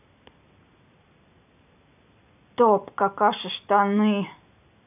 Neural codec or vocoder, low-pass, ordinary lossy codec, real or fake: none; 3.6 kHz; none; real